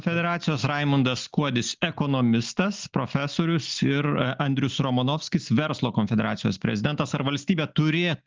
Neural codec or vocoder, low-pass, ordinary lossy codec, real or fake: none; 7.2 kHz; Opus, 32 kbps; real